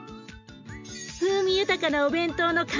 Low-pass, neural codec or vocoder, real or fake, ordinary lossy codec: 7.2 kHz; none; real; MP3, 64 kbps